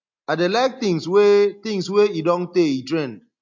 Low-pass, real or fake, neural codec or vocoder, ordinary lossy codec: 7.2 kHz; real; none; MP3, 48 kbps